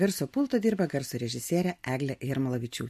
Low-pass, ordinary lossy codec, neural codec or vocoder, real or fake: 14.4 kHz; MP3, 64 kbps; none; real